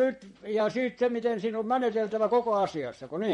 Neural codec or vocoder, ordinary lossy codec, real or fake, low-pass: vocoder, 44.1 kHz, 128 mel bands, Pupu-Vocoder; MP3, 48 kbps; fake; 19.8 kHz